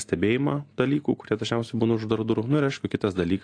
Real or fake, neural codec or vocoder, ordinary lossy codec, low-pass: real; none; AAC, 48 kbps; 9.9 kHz